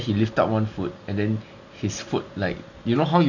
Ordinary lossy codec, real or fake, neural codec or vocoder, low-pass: none; real; none; 7.2 kHz